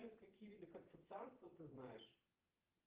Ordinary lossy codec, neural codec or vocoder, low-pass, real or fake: Opus, 16 kbps; vocoder, 22.05 kHz, 80 mel bands, Vocos; 3.6 kHz; fake